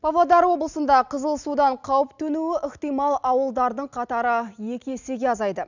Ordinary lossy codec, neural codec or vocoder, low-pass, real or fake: none; none; 7.2 kHz; real